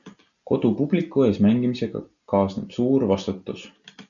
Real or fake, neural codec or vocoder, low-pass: real; none; 7.2 kHz